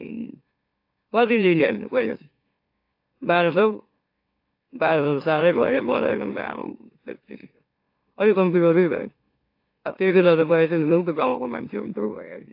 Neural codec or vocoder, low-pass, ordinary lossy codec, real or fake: autoencoder, 44.1 kHz, a latent of 192 numbers a frame, MeloTTS; 5.4 kHz; AAC, 32 kbps; fake